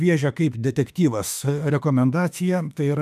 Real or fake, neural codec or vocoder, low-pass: fake; autoencoder, 48 kHz, 32 numbers a frame, DAC-VAE, trained on Japanese speech; 14.4 kHz